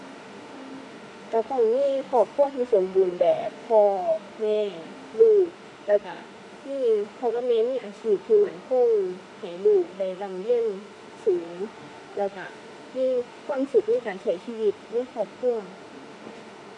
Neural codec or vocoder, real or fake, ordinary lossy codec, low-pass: autoencoder, 48 kHz, 32 numbers a frame, DAC-VAE, trained on Japanese speech; fake; none; 10.8 kHz